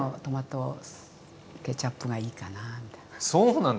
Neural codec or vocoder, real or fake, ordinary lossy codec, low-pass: none; real; none; none